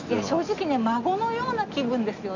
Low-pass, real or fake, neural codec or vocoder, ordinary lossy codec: 7.2 kHz; real; none; none